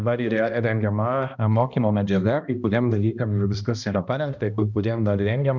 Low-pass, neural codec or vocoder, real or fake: 7.2 kHz; codec, 16 kHz, 1 kbps, X-Codec, HuBERT features, trained on balanced general audio; fake